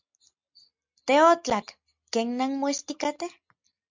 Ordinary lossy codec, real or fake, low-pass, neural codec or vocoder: MP3, 64 kbps; real; 7.2 kHz; none